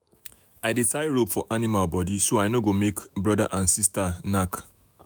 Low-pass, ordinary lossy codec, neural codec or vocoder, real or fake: none; none; autoencoder, 48 kHz, 128 numbers a frame, DAC-VAE, trained on Japanese speech; fake